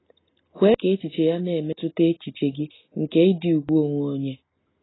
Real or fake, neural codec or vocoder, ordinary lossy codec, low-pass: real; none; AAC, 16 kbps; 7.2 kHz